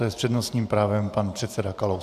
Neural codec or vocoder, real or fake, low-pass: vocoder, 44.1 kHz, 128 mel bands every 512 samples, BigVGAN v2; fake; 14.4 kHz